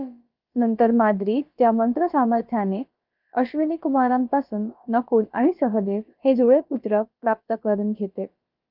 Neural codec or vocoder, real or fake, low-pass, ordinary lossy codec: codec, 16 kHz, about 1 kbps, DyCAST, with the encoder's durations; fake; 5.4 kHz; Opus, 24 kbps